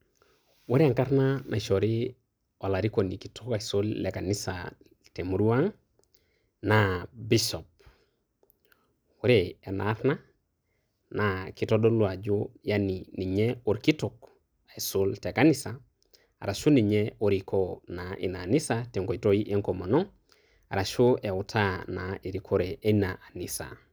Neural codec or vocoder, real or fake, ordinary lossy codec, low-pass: none; real; none; none